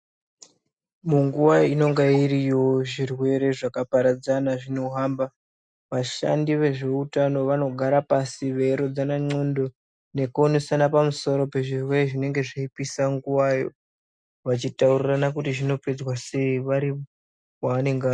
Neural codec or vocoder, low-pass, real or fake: none; 9.9 kHz; real